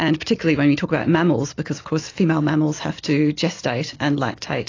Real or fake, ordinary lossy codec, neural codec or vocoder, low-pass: real; AAC, 32 kbps; none; 7.2 kHz